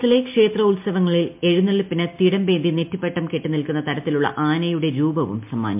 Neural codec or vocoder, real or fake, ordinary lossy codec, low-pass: none; real; MP3, 32 kbps; 3.6 kHz